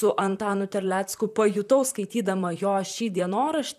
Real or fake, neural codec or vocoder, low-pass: fake; vocoder, 44.1 kHz, 128 mel bands, Pupu-Vocoder; 14.4 kHz